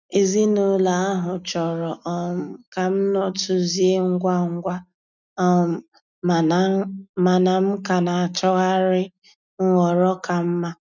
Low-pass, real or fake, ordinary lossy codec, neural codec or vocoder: 7.2 kHz; real; none; none